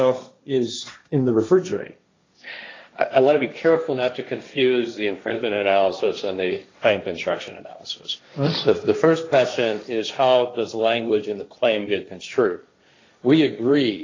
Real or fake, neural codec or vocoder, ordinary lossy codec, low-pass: fake; codec, 16 kHz, 1.1 kbps, Voila-Tokenizer; AAC, 32 kbps; 7.2 kHz